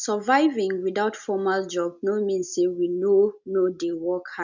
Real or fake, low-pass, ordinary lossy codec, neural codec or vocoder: real; 7.2 kHz; none; none